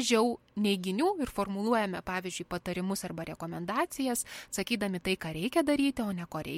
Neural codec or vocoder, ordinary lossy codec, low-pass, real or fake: none; MP3, 64 kbps; 19.8 kHz; real